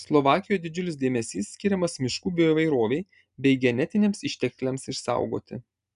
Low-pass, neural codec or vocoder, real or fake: 10.8 kHz; none; real